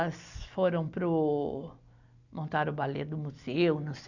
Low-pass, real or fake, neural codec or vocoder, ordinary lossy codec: 7.2 kHz; real; none; none